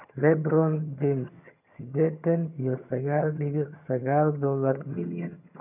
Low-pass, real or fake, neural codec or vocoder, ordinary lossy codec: 3.6 kHz; fake; vocoder, 22.05 kHz, 80 mel bands, HiFi-GAN; none